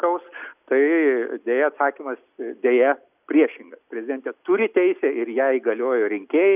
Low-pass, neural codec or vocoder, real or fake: 3.6 kHz; none; real